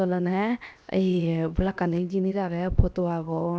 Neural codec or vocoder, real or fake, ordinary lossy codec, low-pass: codec, 16 kHz, 0.7 kbps, FocalCodec; fake; none; none